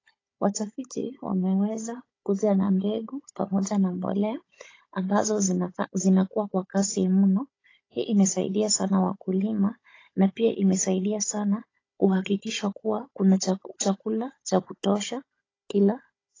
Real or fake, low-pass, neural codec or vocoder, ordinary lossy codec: fake; 7.2 kHz; codec, 16 kHz, 16 kbps, FunCodec, trained on Chinese and English, 50 frames a second; AAC, 32 kbps